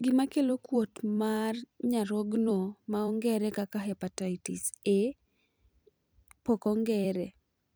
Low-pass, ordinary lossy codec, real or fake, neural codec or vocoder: none; none; fake; vocoder, 44.1 kHz, 128 mel bands every 256 samples, BigVGAN v2